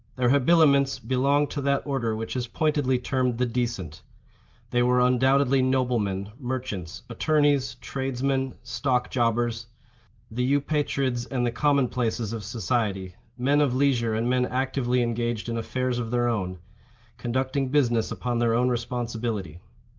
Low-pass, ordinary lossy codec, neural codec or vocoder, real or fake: 7.2 kHz; Opus, 24 kbps; none; real